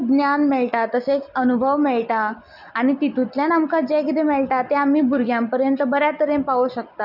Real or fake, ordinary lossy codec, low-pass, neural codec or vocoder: real; none; 5.4 kHz; none